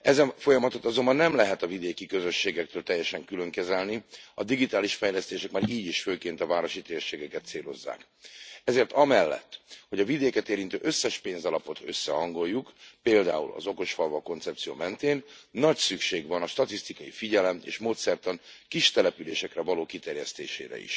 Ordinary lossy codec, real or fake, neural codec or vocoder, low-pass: none; real; none; none